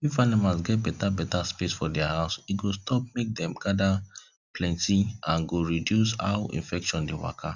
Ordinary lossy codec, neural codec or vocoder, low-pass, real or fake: none; none; 7.2 kHz; real